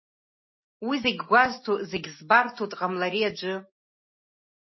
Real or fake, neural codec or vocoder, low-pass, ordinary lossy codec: real; none; 7.2 kHz; MP3, 24 kbps